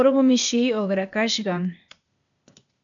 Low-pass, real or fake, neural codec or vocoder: 7.2 kHz; fake; codec, 16 kHz, 0.8 kbps, ZipCodec